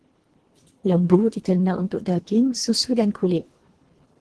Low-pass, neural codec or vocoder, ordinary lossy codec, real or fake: 10.8 kHz; codec, 24 kHz, 1.5 kbps, HILCodec; Opus, 16 kbps; fake